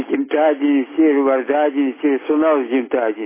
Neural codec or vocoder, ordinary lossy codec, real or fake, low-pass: none; MP3, 16 kbps; real; 3.6 kHz